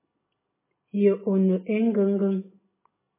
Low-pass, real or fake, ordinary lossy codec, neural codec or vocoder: 3.6 kHz; real; MP3, 16 kbps; none